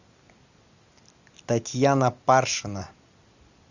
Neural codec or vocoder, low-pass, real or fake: none; 7.2 kHz; real